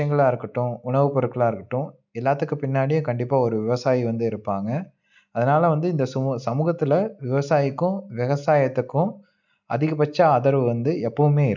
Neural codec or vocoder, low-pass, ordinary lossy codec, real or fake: none; 7.2 kHz; none; real